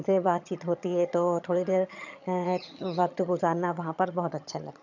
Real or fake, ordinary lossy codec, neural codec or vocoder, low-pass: fake; none; vocoder, 22.05 kHz, 80 mel bands, HiFi-GAN; 7.2 kHz